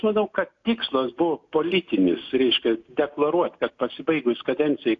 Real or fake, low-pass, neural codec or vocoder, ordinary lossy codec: real; 7.2 kHz; none; AAC, 48 kbps